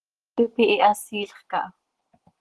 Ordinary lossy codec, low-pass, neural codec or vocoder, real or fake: Opus, 16 kbps; 10.8 kHz; none; real